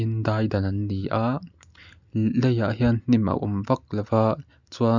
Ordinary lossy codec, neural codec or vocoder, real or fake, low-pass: none; none; real; 7.2 kHz